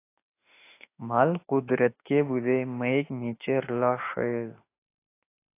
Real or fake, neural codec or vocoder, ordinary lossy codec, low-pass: fake; autoencoder, 48 kHz, 32 numbers a frame, DAC-VAE, trained on Japanese speech; AAC, 24 kbps; 3.6 kHz